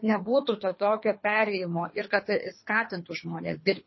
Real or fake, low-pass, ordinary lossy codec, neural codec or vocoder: fake; 7.2 kHz; MP3, 24 kbps; codec, 24 kHz, 3 kbps, HILCodec